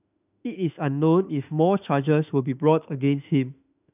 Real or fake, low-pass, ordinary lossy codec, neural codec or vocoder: fake; 3.6 kHz; none; autoencoder, 48 kHz, 32 numbers a frame, DAC-VAE, trained on Japanese speech